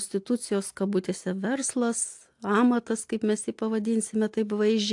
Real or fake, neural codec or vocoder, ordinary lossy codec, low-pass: real; none; AAC, 64 kbps; 10.8 kHz